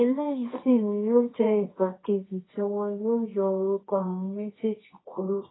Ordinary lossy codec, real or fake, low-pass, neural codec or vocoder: AAC, 16 kbps; fake; 7.2 kHz; codec, 24 kHz, 0.9 kbps, WavTokenizer, medium music audio release